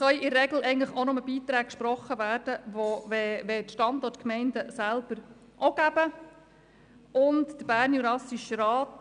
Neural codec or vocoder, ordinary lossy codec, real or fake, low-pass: none; none; real; 9.9 kHz